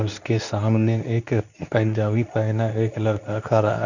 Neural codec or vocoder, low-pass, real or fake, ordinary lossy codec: codec, 24 kHz, 0.9 kbps, WavTokenizer, medium speech release version 2; 7.2 kHz; fake; none